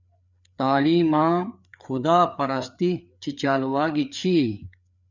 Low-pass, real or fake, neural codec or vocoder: 7.2 kHz; fake; codec, 16 kHz, 4 kbps, FreqCodec, larger model